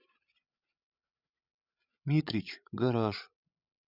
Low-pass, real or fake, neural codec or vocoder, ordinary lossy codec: 5.4 kHz; fake; codec, 16 kHz, 16 kbps, FreqCodec, larger model; none